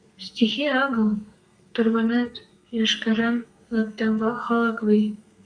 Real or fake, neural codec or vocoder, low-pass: fake; codec, 32 kHz, 1.9 kbps, SNAC; 9.9 kHz